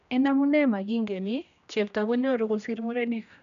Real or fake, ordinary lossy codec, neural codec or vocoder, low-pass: fake; none; codec, 16 kHz, 1 kbps, X-Codec, HuBERT features, trained on general audio; 7.2 kHz